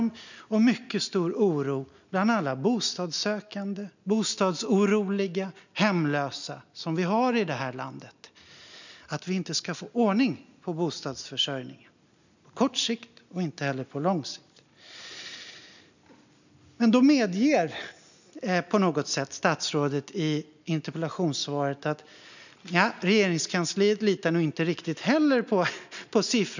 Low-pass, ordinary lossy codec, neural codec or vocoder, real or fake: 7.2 kHz; none; none; real